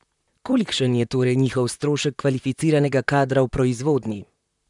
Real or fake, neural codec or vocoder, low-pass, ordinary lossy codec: fake; vocoder, 44.1 kHz, 128 mel bands, Pupu-Vocoder; 10.8 kHz; none